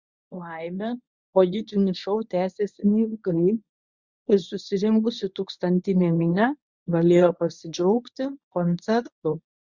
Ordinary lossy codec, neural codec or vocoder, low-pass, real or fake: Opus, 64 kbps; codec, 24 kHz, 0.9 kbps, WavTokenizer, medium speech release version 1; 7.2 kHz; fake